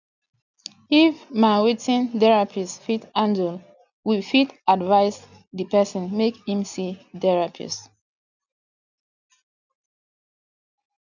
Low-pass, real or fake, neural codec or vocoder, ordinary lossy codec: 7.2 kHz; real; none; none